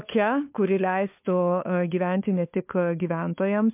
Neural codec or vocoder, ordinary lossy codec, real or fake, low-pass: codec, 16 kHz, 4 kbps, FunCodec, trained on LibriTTS, 50 frames a second; MP3, 32 kbps; fake; 3.6 kHz